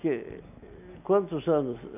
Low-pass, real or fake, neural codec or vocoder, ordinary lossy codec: 3.6 kHz; real; none; none